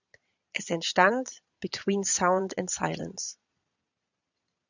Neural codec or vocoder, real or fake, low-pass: vocoder, 22.05 kHz, 80 mel bands, Vocos; fake; 7.2 kHz